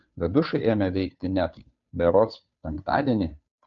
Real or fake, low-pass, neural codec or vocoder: fake; 7.2 kHz; codec, 16 kHz, 2 kbps, FunCodec, trained on Chinese and English, 25 frames a second